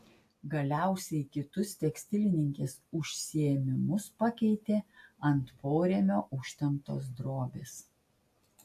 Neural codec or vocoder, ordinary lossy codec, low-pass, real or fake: none; AAC, 64 kbps; 14.4 kHz; real